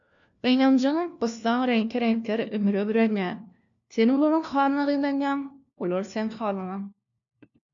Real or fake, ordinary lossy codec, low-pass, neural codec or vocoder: fake; AAC, 64 kbps; 7.2 kHz; codec, 16 kHz, 1 kbps, FunCodec, trained on LibriTTS, 50 frames a second